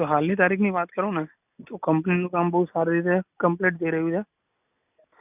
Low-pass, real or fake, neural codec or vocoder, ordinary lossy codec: 3.6 kHz; real; none; none